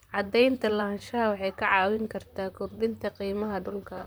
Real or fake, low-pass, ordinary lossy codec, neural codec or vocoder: fake; none; none; vocoder, 44.1 kHz, 128 mel bands, Pupu-Vocoder